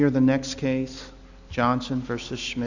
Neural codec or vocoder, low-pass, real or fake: none; 7.2 kHz; real